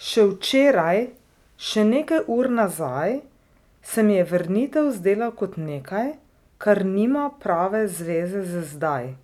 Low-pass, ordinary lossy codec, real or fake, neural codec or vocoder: 19.8 kHz; none; real; none